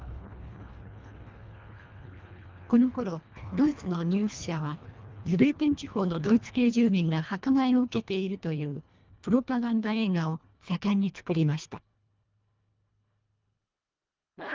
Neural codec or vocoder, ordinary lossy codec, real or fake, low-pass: codec, 24 kHz, 1.5 kbps, HILCodec; Opus, 24 kbps; fake; 7.2 kHz